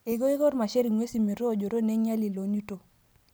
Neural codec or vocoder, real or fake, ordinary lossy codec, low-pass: none; real; none; none